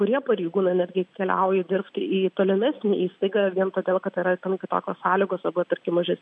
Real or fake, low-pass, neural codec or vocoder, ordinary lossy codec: real; 9.9 kHz; none; MP3, 96 kbps